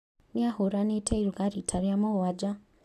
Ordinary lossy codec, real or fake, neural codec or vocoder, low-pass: none; real; none; 14.4 kHz